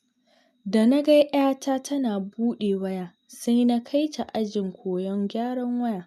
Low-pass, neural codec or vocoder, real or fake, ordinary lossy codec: 14.4 kHz; none; real; none